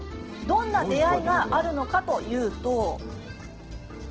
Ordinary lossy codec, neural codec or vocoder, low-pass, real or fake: Opus, 16 kbps; none; 7.2 kHz; real